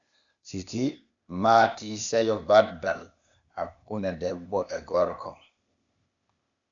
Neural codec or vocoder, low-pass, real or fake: codec, 16 kHz, 0.8 kbps, ZipCodec; 7.2 kHz; fake